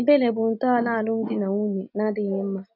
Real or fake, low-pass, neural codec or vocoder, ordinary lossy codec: real; 5.4 kHz; none; none